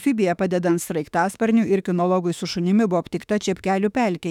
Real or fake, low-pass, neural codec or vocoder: fake; 19.8 kHz; autoencoder, 48 kHz, 32 numbers a frame, DAC-VAE, trained on Japanese speech